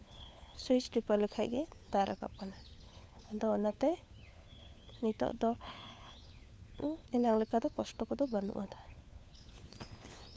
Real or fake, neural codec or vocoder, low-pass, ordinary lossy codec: fake; codec, 16 kHz, 4 kbps, FunCodec, trained on LibriTTS, 50 frames a second; none; none